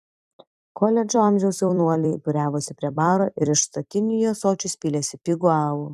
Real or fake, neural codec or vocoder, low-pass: fake; vocoder, 44.1 kHz, 128 mel bands every 256 samples, BigVGAN v2; 14.4 kHz